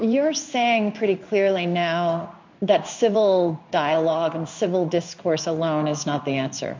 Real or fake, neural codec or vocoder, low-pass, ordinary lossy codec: fake; codec, 16 kHz in and 24 kHz out, 1 kbps, XY-Tokenizer; 7.2 kHz; MP3, 48 kbps